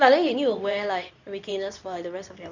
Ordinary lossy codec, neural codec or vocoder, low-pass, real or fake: none; codec, 24 kHz, 0.9 kbps, WavTokenizer, medium speech release version 2; 7.2 kHz; fake